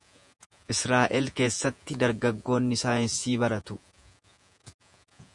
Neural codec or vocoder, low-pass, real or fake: vocoder, 48 kHz, 128 mel bands, Vocos; 10.8 kHz; fake